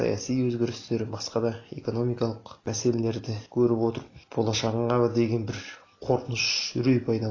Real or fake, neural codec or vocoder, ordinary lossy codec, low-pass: real; none; AAC, 32 kbps; 7.2 kHz